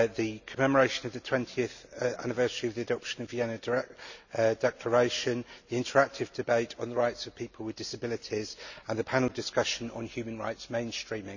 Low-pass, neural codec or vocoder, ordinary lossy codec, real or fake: 7.2 kHz; none; none; real